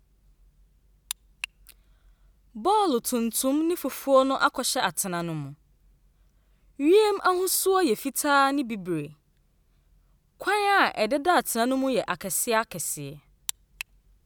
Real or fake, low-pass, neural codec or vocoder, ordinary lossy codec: real; none; none; none